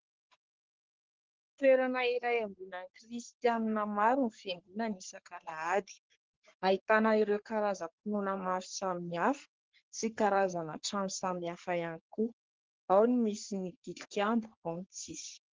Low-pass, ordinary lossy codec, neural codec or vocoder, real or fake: 7.2 kHz; Opus, 16 kbps; codec, 44.1 kHz, 3.4 kbps, Pupu-Codec; fake